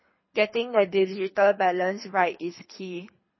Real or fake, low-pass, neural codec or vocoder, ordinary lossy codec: fake; 7.2 kHz; codec, 24 kHz, 3 kbps, HILCodec; MP3, 24 kbps